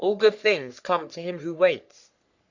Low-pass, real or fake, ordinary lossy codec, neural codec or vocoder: 7.2 kHz; fake; Opus, 64 kbps; codec, 44.1 kHz, 3.4 kbps, Pupu-Codec